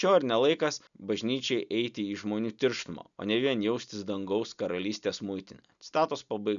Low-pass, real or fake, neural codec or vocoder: 7.2 kHz; real; none